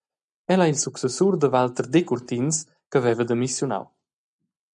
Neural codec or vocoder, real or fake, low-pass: none; real; 9.9 kHz